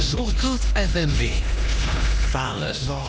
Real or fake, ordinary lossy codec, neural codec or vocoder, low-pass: fake; none; codec, 16 kHz, 1 kbps, X-Codec, HuBERT features, trained on LibriSpeech; none